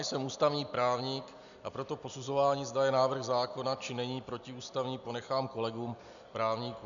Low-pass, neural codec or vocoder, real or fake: 7.2 kHz; none; real